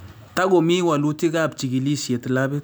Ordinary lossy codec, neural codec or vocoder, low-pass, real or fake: none; none; none; real